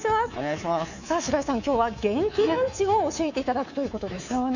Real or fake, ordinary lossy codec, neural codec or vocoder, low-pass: fake; none; codec, 24 kHz, 3.1 kbps, DualCodec; 7.2 kHz